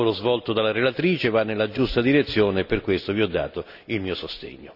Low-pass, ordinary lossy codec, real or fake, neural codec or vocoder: 5.4 kHz; none; real; none